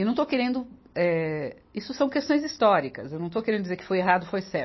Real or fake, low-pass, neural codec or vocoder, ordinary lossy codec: real; 7.2 kHz; none; MP3, 24 kbps